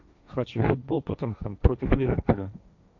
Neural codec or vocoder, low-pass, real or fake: codec, 16 kHz, 1.1 kbps, Voila-Tokenizer; 7.2 kHz; fake